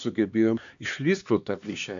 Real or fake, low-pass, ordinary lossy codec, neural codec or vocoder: fake; 7.2 kHz; AAC, 64 kbps; codec, 16 kHz, 2 kbps, X-Codec, HuBERT features, trained on LibriSpeech